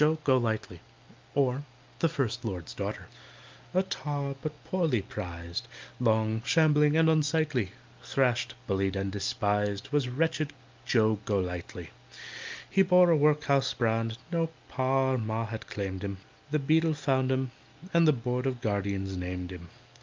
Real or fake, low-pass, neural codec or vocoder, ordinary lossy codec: real; 7.2 kHz; none; Opus, 24 kbps